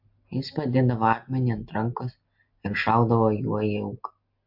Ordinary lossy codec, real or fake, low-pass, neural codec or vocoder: MP3, 48 kbps; real; 5.4 kHz; none